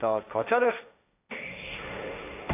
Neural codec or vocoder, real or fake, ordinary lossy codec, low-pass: codec, 16 kHz, 1.1 kbps, Voila-Tokenizer; fake; AAC, 32 kbps; 3.6 kHz